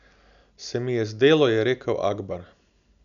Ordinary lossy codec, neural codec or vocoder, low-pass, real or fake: none; none; 7.2 kHz; real